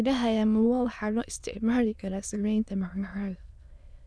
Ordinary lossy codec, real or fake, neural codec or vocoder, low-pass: none; fake; autoencoder, 22.05 kHz, a latent of 192 numbers a frame, VITS, trained on many speakers; none